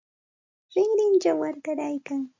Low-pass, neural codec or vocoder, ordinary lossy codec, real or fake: 7.2 kHz; none; MP3, 64 kbps; real